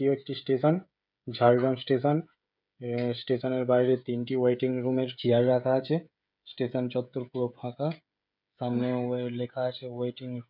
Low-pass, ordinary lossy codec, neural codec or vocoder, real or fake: 5.4 kHz; none; codec, 16 kHz, 16 kbps, FreqCodec, smaller model; fake